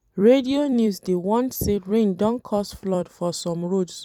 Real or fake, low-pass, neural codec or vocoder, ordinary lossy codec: fake; 19.8 kHz; vocoder, 44.1 kHz, 128 mel bands every 256 samples, BigVGAN v2; none